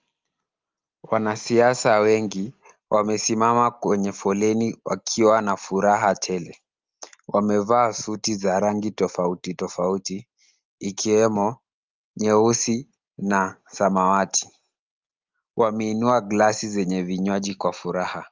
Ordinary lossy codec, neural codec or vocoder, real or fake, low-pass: Opus, 32 kbps; none; real; 7.2 kHz